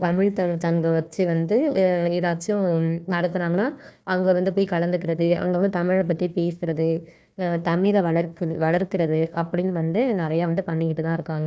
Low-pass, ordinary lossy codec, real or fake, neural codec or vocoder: none; none; fake; codec, 16 kHz, 1 kbps, FunCodec, trained on Chinese and English, 50 frames a second